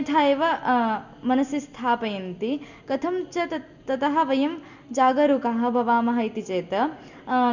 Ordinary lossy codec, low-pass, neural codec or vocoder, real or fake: none; 7.2 kHz; none; real